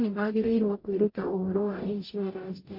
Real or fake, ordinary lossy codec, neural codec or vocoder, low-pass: fake; MP3, 32 kbps; codec, 44.1 kHz, 0.9 kbps, DAC; 5.4 kHz